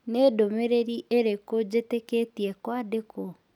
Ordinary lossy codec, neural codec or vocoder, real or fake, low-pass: none; none; real; 19.8 kHz